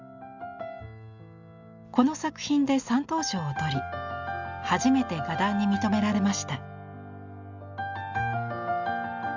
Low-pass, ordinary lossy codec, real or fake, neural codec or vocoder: 7.2 kHz; Opus, 64 kbps; real; none